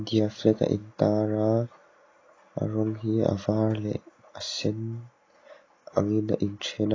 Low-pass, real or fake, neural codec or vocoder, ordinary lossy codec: 7.2 kHz; real; none; AAC, 32 kbps